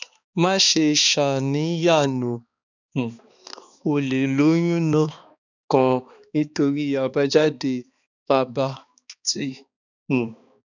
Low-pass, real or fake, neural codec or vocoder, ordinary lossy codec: 7.2 kHz; fake; codec, 16 kHz, 2 kbps, X-Codec, HuBERT features, trained on balanced general audio; none